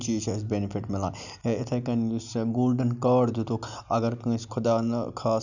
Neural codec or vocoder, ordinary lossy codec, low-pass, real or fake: none; none; 7.2 kHz; real